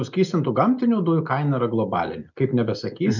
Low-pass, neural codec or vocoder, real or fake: 7.2 kHz; none; real